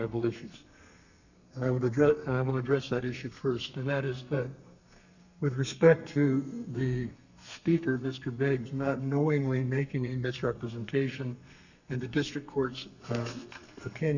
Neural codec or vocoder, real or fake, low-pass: codec, 32 kHz, 1.9 kbps, SNAC; fake; 7.2 kHz